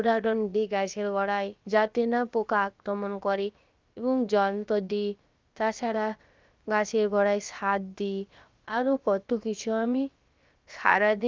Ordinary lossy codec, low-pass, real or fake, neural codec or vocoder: Opus, 32 kbps; 7.2 kHz; fake; codec, 16 kHz, about 1 kbps, DyCAST, with the encoder's durations